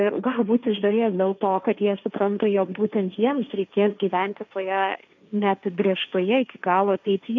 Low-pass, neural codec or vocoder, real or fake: 7.2 kHz; codec, 16 kHz, 1.1 kbps, Voila-Tokenizer; fake